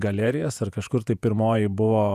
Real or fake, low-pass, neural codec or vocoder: fake; 14.4 kHz; vocoder, 48 kHz, 128 mel bands, Vocos